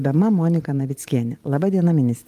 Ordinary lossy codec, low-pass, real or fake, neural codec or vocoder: Opus, 32 kbps; 14.4 kHz; fake; vocoder, 44.1 kHz, 128 mel bands every 512 samples, BigVGAN v2